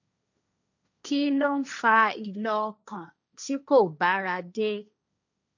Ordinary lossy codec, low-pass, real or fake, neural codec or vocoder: none; 7.2 kHz; fake; codec, 16 kHz, 1.1 kbps, Voila-Tokenizer